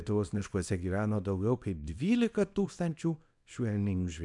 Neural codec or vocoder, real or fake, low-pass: codec, 24 kHz, 0.9 kbps, WavTokenizer, small release; fake; 10.8 kHz